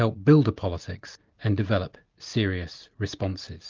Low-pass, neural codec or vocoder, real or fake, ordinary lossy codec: 7.2 kHz; none; real; Opus, 24 kbps